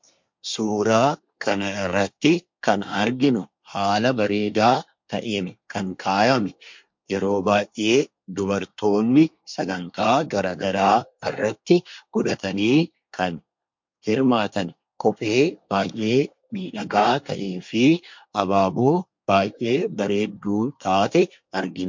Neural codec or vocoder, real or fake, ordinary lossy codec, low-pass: codec, 24 kHz, 1 kbps, SNAC; fake; MP3, 48 kbps; 7.2 kHz